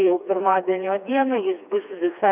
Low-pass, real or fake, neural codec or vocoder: 3.6 kHz; fake; codec, 16 kHz, 2 kbps, FreqCodec, smaller model